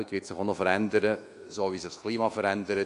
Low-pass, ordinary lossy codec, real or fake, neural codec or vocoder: 10.8 kHz; AAC, 48 kbps; fake; codec, 24 kHz, 1.2 kbps, DualCodec